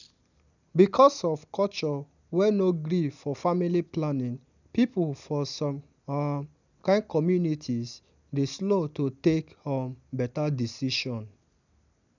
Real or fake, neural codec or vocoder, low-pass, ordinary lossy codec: real; none; 7.2 kHz; none